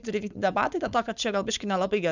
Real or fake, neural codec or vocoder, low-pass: fake; codec, 16 kHz, 4.8 kbps, FACodec; 7.2 kHz